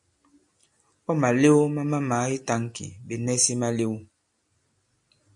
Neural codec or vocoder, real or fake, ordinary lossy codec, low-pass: none; real; MP3, 48 kbps; 10.8 kHz